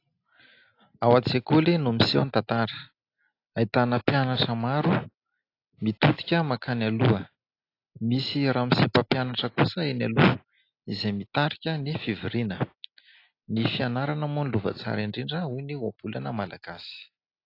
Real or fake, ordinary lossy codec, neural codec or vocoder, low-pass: real; AAC, 32 kbps; none; 5.4 kHz